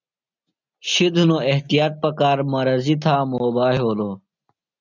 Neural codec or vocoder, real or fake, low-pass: none; real; 7.2 kHz